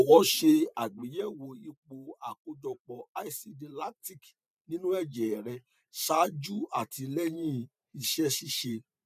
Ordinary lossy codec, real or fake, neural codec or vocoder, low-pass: none; fake; vocoder, 44.1 kHz, 128 mel bands every 512 samples, BigVGAN v2; 14.4 kHz